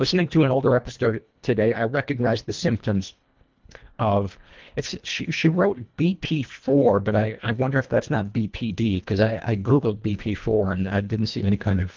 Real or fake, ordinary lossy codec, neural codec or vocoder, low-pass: fake; Opus, 32 kbps; codec, 24 kHz, 1.5 kbps, HILCodec; 7.2 kHz